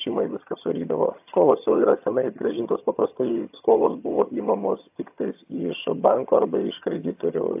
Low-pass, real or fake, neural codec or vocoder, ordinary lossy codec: 3.6 kHz; fake; vocoder, 22.05 kHz, 80 mel bands, HiFi-GAN; AAC, 32 kbps